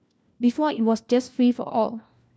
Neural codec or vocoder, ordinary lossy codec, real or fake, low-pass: codec, 16 kHz, 1 kbps, FunCodec, trained on LibriTTS, 50 frames a second; none; fake; none